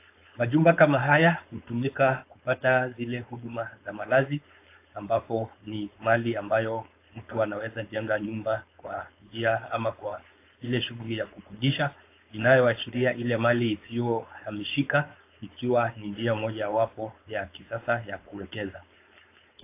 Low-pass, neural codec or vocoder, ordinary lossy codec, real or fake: 3.6 kHz; codec, 16 kHz, 4.8 kbps, FACodec; AAC, 24 kbps; fake